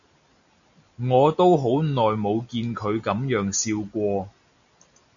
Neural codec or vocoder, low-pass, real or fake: none; 7.2 kHz; real